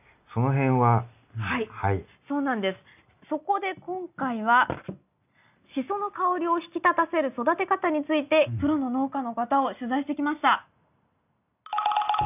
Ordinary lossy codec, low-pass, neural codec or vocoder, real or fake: none; 3.6 kHz; none; real